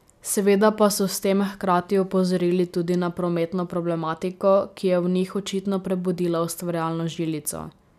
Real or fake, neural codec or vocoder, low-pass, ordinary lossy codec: real; none; 14.4 kHz; none